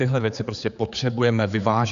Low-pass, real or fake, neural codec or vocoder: 7.2 kHz; fake; codec, 16 kHz, 4 kbps, X-Codec, HuBERT features, trained on general audio